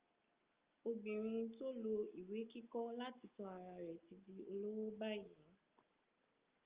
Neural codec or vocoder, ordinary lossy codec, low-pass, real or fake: none; Opus, 32 kbps; 3.6 kHz; real